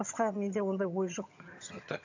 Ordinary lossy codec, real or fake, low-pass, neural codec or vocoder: AAC, 48 kbps; fake; 7.2 kHz; vocoder, 22.05 kHz, 80 mel bands, HiFi-GAN